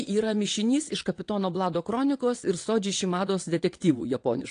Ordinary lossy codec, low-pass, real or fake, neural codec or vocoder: AAC, 48 kbps; 9.9 kHz; fake; vocoder, 22.05 kHz, 80 mel bands, WaveNeXt